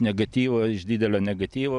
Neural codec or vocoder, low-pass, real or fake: none; 10.8 kHz; real